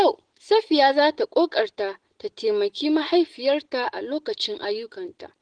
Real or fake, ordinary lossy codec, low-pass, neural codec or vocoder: real; Opus, 16 kbps; 9.9 kHz; none